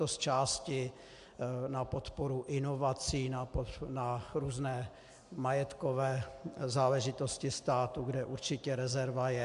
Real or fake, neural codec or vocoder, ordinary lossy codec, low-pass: real; none; AAC, 64 kbps; 10.8 kHz